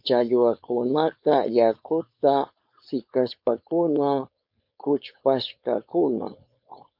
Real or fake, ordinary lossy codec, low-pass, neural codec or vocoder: fake; MP3, 48 kbps; 5.4 kHz; codec, 16 kHz, 4.8 kbps, FACodec